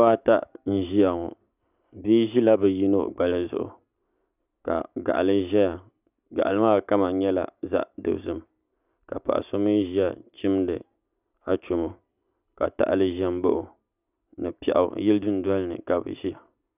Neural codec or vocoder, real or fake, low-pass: none; real; 3.6 kHz